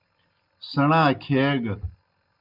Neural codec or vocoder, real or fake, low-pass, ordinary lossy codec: none; real; 5.4 kHz; Opus, 24 kbps